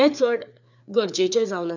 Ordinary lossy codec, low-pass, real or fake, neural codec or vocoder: none; 7.2 kHz; fake; codec, 16 kHz, 4 kbps, FreqCodec, larger model